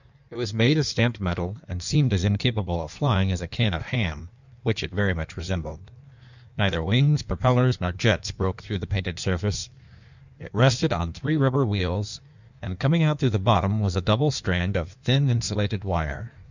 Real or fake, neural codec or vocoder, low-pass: fake; codec, 16 kHz in and 24 kHz out, 1.1 kbps, FireRedTTS-2 codec; 7.2 kHz